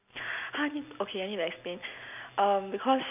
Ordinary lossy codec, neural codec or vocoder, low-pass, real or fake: none; none; 3.6 kHz; real